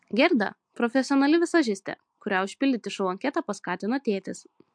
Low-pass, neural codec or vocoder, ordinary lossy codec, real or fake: 9.9 kHz; none; MP3, 64 kbps; real